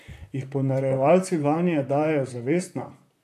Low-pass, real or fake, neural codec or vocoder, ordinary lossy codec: 14.4 kHz; fake; vocoder, 44.1 kHz, 128 mel bands, Pupu-Vocoder; AAC, 64 kbps